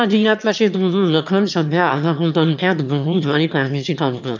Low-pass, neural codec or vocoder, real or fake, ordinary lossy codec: 7.2 kHz; autoencoder, 22.05 kHz, a latent of 192 numbers a frame, VITS, trained on one speaker; fake; none